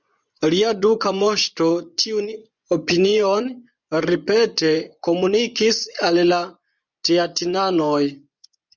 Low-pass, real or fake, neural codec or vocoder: 7.2 kHz; fake; vocoder, 24 kHz, 100 mel bands, Vocos